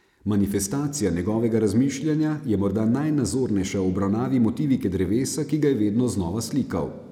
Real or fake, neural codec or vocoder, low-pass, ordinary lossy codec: real; none; 19.8 kHz; none